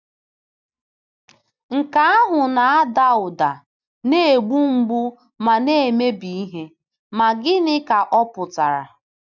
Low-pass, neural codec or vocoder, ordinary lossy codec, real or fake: 7.2 kHz; none; none; real